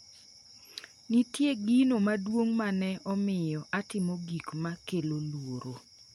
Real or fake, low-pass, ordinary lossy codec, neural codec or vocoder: real; 19.8 kHz; MP3, 64 kbps; none